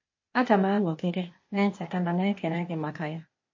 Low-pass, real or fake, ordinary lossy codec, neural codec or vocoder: 7.2 kHz; fake; MP3, 32 kbps; codec, 16 kHz, 0.8 kbps, ZipCodec